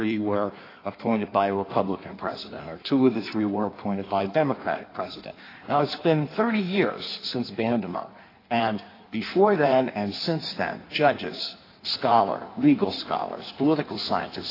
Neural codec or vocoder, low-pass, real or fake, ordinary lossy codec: codec, 16 kHz in and 24 kHz out, 1.1 kbps, FireRedTTS-2 codec; 5.4 kHz; fake; AAC, 24 kbps